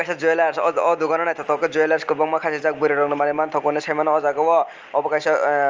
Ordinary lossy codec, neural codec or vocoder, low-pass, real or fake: Opus, 24 kbps; none; 7.2 kHz; real